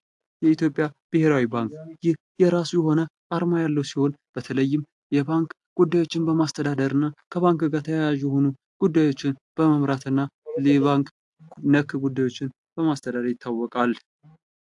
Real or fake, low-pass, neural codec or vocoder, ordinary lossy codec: real; 10.8 kHz; none; AAC, 64 kbps